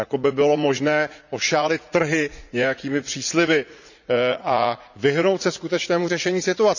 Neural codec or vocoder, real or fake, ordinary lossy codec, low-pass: vocoder, 44.1 kHz, 80 mel bands, Vocos; fake; none; 7.2 kHz